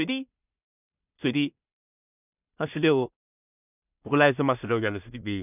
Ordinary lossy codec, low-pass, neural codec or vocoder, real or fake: none; 3.6 kHz; codec, 16 kHz in and 24 kHz out, 0.4 kbps, LongCat-Audio-Codec, two codebook decoder; fake